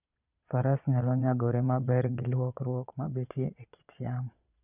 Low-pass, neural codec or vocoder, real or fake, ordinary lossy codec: 3.6 kHz; vocoder, 22.05 kHz, 80 mel bands, Vocos; fake; none